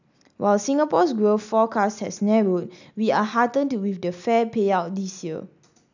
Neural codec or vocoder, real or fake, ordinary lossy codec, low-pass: none; real; none; 7.2 kHz